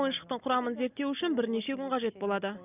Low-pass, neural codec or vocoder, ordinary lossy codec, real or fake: 3.6 kHz; none; none; real